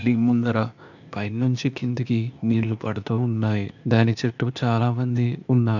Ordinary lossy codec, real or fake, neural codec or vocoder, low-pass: none; fake; codec, 16 kHz, 0.8 kbps, ZipCodec; 7.2 kHz